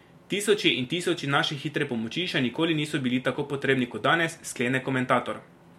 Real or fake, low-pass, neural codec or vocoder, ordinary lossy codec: real; 19.8 kHz; none; MP3, 64 kbps